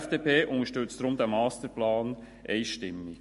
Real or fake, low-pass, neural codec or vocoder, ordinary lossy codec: real; 14.4 kHz; none; MP3, 48 kbps